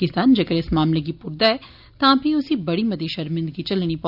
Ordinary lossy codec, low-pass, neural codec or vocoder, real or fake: none; 5.4 kHz; none; real